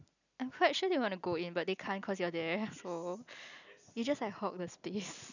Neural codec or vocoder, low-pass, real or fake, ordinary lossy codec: none; 7.2 kHz; real; none